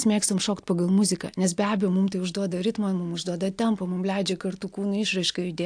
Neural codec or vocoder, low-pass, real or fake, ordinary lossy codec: none; 9.9 kHz; real; AAC, 64 kbps